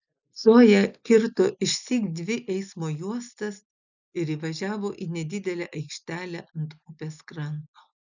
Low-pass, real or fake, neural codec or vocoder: 7.2 kHz; real; none